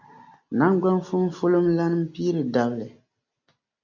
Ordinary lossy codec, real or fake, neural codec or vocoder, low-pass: MP3, 64 kbps; real; none; 7.2 kHz